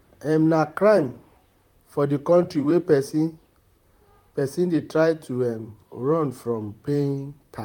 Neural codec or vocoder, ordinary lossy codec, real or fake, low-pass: vocoder, 44.1 kHz, 128 mel bands, Pupu-Vocoder; none; fake; 19.8 kHz